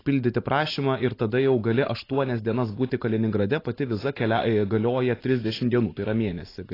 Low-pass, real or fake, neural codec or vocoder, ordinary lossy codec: 5.4 kHz; real; none; AAC, 24 kbps